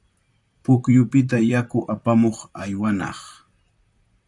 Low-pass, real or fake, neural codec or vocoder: 10.8 kHz; fake; vocoder, 44.1 kHz, 128 mel bands, Pupu-Vocoder